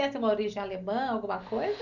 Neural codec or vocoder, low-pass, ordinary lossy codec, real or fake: none; 7.2 kHz; none; real